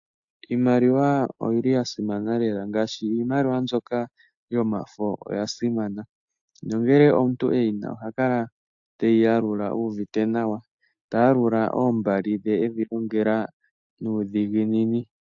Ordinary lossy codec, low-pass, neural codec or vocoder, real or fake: AAC, 64 kbps; 7.2 kHz; none; real